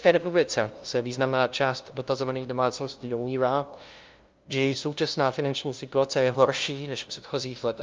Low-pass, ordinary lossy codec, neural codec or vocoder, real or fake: 7.2 kHz; Opus, 24 kbps; codec, 16 kHz, 0.5 kbps, FunCodec, trained on LibriTTS, 25 frames a second; fake